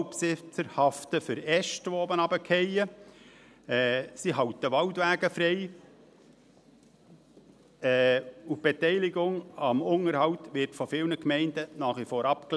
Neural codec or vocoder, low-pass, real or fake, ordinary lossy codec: none; none; real; none